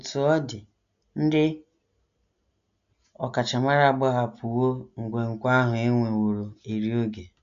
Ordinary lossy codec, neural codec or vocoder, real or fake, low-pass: AAC, 96 kbps; none; real; 7.2 kHz